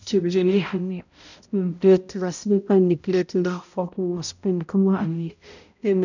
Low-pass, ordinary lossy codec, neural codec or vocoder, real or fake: 7.2 kHz; none; codec, 16 kHz, 0.5 kbps, X-Codec, HuBERT features, trained on balanced general audio; fake